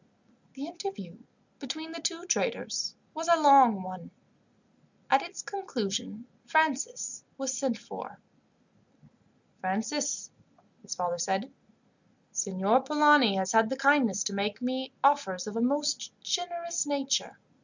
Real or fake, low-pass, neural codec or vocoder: real; 7.2 kHz; none